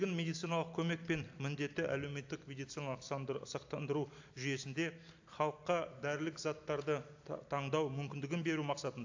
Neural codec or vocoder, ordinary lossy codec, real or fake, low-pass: vocoder, 44.1 kHz, 128 mel bands every 256 samples, BigVGAN v2; AAC, 48 kbps; fake; 7.2 kHz